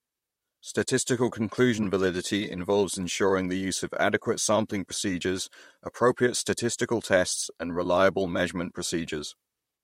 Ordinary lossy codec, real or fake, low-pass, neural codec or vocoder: MP3, 64 kbps; fake; 19.8 kHz; vocoder, 44.1 kHz, 128 mel bands, Pupu-Vocoder